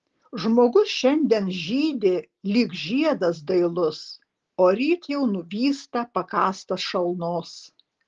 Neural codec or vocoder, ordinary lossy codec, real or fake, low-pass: none; Opus, 16 kbps; real; 7.2 kHz